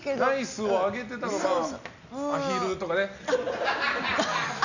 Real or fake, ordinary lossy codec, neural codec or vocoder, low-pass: real; none; none; 7.2 kHz